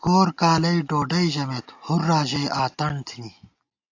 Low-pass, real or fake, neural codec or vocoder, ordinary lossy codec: 7.2 kHz; real; none; AAC, 48 kbps